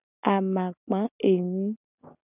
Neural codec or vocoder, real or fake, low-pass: none; real; 3.6 kHz